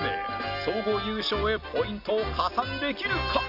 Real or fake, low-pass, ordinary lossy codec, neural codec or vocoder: real; 5.4 kHz; none; none